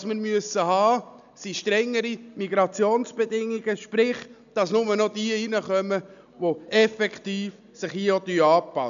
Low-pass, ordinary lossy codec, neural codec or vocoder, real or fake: 7.2 kHz; AAC, 96 kbps; none; real